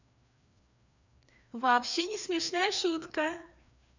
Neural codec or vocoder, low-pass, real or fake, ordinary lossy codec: codec, 16 kHz, 2 kbps, FreqCodec, larger model; 7.2 kHz; fake; none